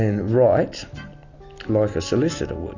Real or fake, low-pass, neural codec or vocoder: real; 7.2 kHz; none